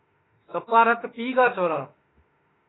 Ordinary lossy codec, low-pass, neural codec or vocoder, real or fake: AAC, 16 kbps; 7.2 kHz; autoencoder, 48 kHz, 32 numbers a frame, DAC-VAE, trained on Japanese speech; fake